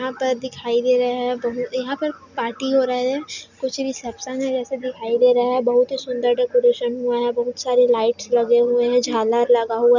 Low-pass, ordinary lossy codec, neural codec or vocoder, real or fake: 7.2 kHz; none; none; real